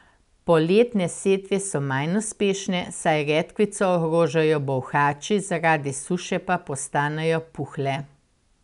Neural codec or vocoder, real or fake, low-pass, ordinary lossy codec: none; real; 10.8 kHz; none